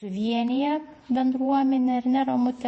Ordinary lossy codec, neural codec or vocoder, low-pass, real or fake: MP3, 32 kbps; vocoder, 48 kHz, 128 mel bands, Vocos; 10.8 kHz; fake